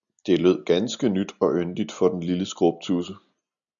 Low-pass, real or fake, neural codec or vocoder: 7.2 kHz; real; none